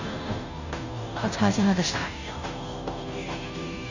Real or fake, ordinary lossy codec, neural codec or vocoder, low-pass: fake; none; codec, 16 kHz, 0.5 kbps, FunCodec, trained on Chinese and English, 25 frames a second; 7.2 kHz